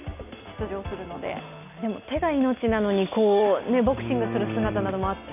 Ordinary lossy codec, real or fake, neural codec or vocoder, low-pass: none; real; none; 3.6 kHz